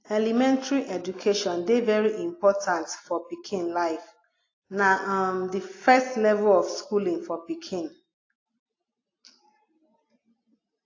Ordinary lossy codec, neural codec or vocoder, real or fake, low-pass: AAC, 32 kbps; none; real; 7.2 kHz